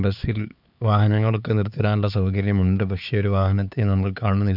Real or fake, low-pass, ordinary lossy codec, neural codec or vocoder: fake; 5.4 kHz; none; codec, 16 kHz, 4 kbps, X-Codec, HuBERT features, trained on LibriSpeech